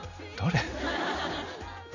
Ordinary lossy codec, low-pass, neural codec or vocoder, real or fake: none; 7.2 kHz; none; real